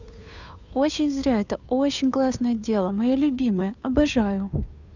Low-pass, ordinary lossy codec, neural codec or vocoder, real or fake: 7.2 kHz; none; codec, 16 kHz, 2 kbps, FunCodec, trained on Chinese and English, 25 frames a second; fake